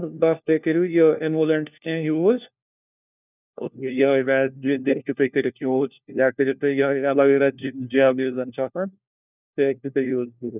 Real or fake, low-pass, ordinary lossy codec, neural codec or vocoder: fake; 3.6 kHz; none; codec, 16 kHz, 1 kbps, FunCodec, trained on LibriTTS, 50 frames a second